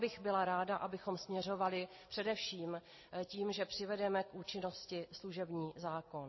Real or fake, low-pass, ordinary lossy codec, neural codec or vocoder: real; 7.2 kHz; MP3, 24 kbps; none